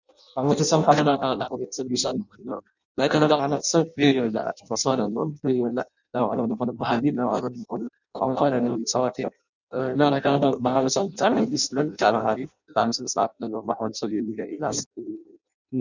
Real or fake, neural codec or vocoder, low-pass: fake; codec, 16 kHz in and 24 kHz out, 0.6 kbps, FireRedTTS-2 codec; 7.2 kHz